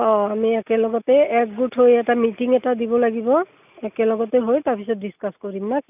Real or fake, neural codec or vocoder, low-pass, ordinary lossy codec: real; none; 3.6 kHz; none